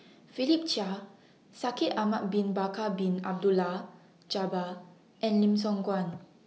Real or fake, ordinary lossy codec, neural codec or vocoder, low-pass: real; none; none; none